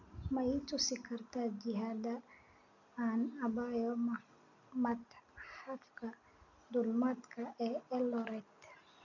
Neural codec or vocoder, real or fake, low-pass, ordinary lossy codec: none; real; 7.2 kHz; none